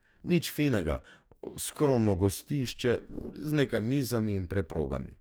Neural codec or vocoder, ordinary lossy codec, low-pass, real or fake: codec, 44.1 kHz, 2.6 kbps, DAC; none; none; fake